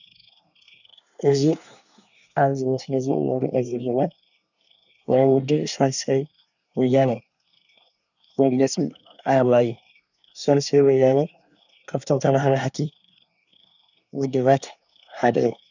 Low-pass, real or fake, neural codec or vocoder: 7.2 kHz; fake; codec, 24 kHz, 1 kbps, SNAC